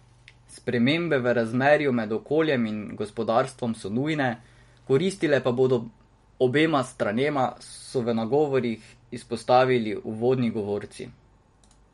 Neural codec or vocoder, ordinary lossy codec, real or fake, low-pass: none; MP3, 48 kbps; real; 14.4 kHz